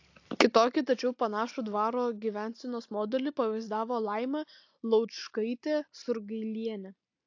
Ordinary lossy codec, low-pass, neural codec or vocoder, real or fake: AAC, 48 kbps; 7.2 kHz; none; real